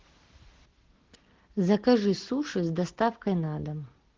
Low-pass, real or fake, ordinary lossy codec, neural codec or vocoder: 7.2 kHz; real; Opus, 16 kbps; none